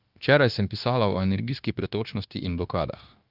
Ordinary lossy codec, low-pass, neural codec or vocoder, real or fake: Opus, 24 kbps; 5.4 kHz; codec, 16 kHz, 0.9 kbps, LongCat-Audio-Codec; fake